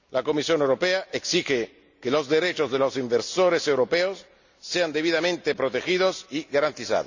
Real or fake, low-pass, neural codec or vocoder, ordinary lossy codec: real; 7.2 kHz; none; none